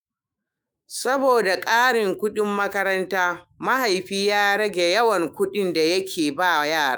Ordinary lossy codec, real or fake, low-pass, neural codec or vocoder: none; fake; none; autoencoder, 48 kHz, 128 numbers a frame, DAC-VAE, trained on Japanese speech